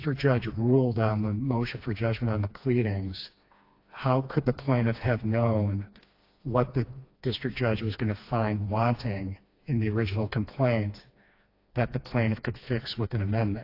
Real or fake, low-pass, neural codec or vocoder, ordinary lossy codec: fake; 5.4 kHz; codec, 16 kHz, 2 kbps, FreqCodec, smaller model; AAC, 32 kbps